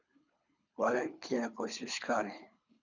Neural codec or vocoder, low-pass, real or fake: codec, 24 kHz, 3 kbps, HILCodec; 7.2 kHz; fake